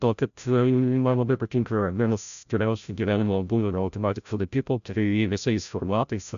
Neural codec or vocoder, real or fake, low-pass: codec, 16 kHz, 0.5 kbps, FreqCodec, larger model; fake; 7.2 kHz